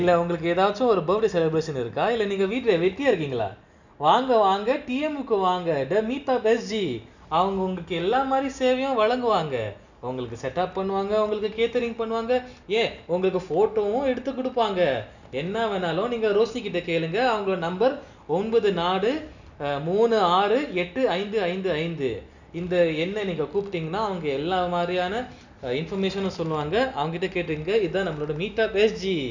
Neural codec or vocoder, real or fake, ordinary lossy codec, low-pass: none; real; none; 7.2 kHz